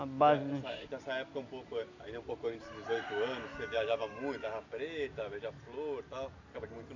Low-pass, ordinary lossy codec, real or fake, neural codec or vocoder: 7.2 kHz; none; real; none